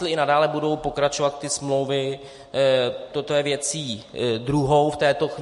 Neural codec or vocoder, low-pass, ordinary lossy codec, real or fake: none; 14.4 kHz; MP3, 48 kbps; real